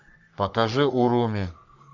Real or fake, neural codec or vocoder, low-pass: fake; codec, 44.1 kHz, 3.4 kbps, Pupu-Codec; 7.2 kHz